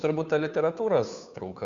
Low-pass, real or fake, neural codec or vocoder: 7.2 kHz; fake; codec, 16 kHz, 2 kbps, FunCodec, trained on Chinese and English, 25 frames a second